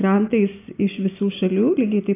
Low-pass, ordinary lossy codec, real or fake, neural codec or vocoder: 3.6 kHz; AAC, 24 kbps; real; none